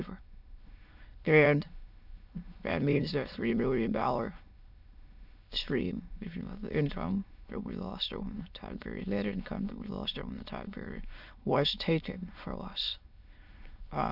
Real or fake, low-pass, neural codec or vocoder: fake; 5.4 kHz; autoencoder, 22.05 kHz, a latent of 192 numbers a frame, VITS, trained on many speakers